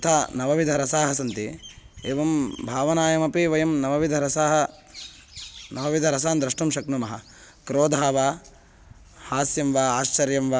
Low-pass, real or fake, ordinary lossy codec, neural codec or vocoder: none; real; none; none